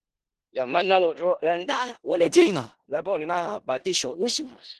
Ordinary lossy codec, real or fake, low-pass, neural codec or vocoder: Opus, 16 kbps; fake; 10.8 kHz; codec, 16 kHz in and 24 kHz out, 0.4 kbps, LongCat-Audio-Codec, four codebook decoder